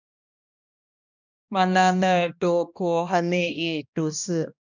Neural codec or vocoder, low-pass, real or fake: codec, 16 kHz, 1 kbps, X-Codec, HuBERT features, trained on balanced general audio; 7.2 kHz; fake